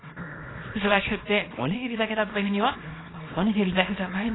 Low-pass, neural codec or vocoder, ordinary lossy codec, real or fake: 7.2 kHz; codec, 24 kHz, 0.9 kbps, WavTokenizer, small release; AAC, 16 kbps; fake